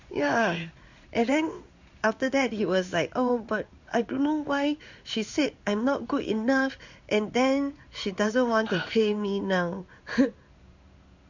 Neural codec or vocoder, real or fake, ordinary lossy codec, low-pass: codec, 16 kHz in and 24 kHz out, 1 kbps, XY-Tokenizer; fake; Opus, 64 kbps; 7.2 kHz